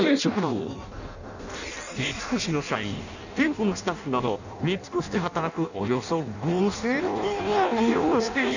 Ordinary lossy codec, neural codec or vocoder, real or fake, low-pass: none; codec, 16 kHz in and 24 kHz out, 0.6 kbps, FireRedTTS-2 codec; fake; 7.2 kHz